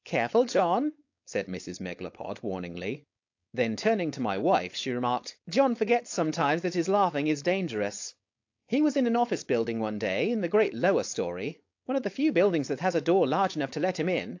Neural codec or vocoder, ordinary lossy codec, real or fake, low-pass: codec, 16 kHz, 4.8 kbps, FACodec; AAC, 48 kbps; fake; 7.2 kHz